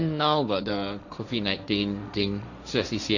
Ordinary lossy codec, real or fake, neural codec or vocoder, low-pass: none; fake; codec, 16 kHz, 1.1 kbps, Voila-Tokenizer; 7.2 kHz